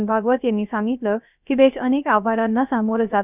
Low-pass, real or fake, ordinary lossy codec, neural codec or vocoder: 3.6 kHz; fake; none; codec, 16 kHz, 0.3 kbps, FocalCodec